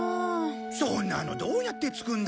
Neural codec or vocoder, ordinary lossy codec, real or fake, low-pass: none; none; real; none